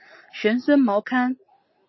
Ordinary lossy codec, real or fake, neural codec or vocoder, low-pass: MP3, 24 kbps; real; none; 7.2 kHz